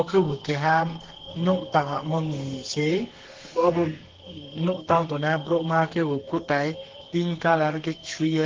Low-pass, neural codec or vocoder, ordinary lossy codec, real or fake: 7.2 kHz; codec, 32 kHz, 1.9 kbps, SNAC; Opus, 16 kbps; fake